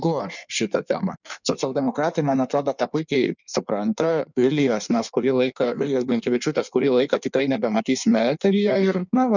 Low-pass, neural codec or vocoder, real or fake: 7.2 kHz; codec, 16 kHz in and 24 kHz out, 1.1 kbps, FireRedTTS-2 codec; fake